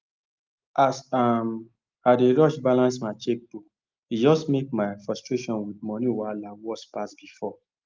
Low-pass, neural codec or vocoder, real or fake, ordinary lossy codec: 7.2 kHz; none; real; Opus, 32 kbps